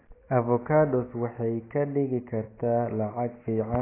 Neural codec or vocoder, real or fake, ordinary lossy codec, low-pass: none; real; AAC, 16 kbps; 3.6 kHz